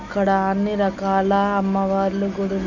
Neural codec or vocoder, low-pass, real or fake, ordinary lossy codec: none; 7.2 kHz; real; none